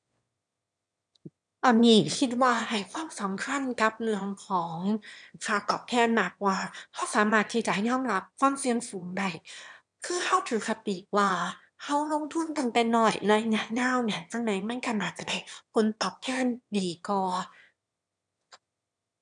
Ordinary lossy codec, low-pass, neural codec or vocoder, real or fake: none; 9.9 kHz; autoencoder, 22.05 kHz, a latent of 192 numbers a frame, VITS, trained on one speaker; fake